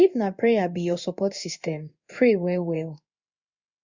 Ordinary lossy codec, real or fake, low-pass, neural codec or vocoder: Opus, 64 kbps; fake; 7.2 kHz; codec, 16 kHz in and 24 kHz out, 1 kbps, XY-Tokenizer